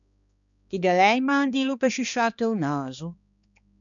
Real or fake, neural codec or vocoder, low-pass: fake; codec, 16 kHz, 2 kbps, X-Codec, HuBERT features, trained on balanced general audio; 7.2 kHz